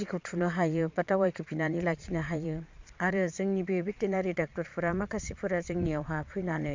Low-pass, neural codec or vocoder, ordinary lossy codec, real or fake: 7.2 kHz; vocoder, 22.05 kHz, 80 mel bands, Vocos; MP3, 64 kbps; fake